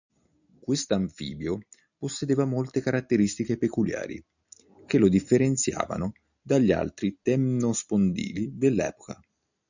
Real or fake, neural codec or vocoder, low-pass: real; none; 7.2 kHz